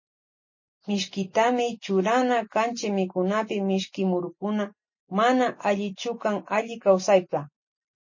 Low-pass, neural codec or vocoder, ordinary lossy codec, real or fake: 7.2 kHz; none; MP3, 32 kbps; real